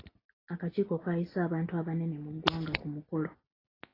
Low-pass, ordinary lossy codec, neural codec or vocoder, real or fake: 5.4 kHz; AAC, 24 kbps; none; real